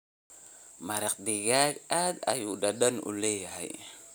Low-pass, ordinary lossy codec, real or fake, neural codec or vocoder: none; none; real; none